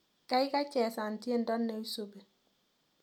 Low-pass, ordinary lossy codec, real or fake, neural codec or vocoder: 19.8 kHz; none; real; none